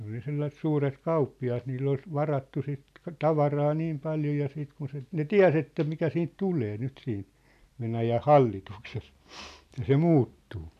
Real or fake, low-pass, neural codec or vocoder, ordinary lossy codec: real; 14.4 kHz; none; none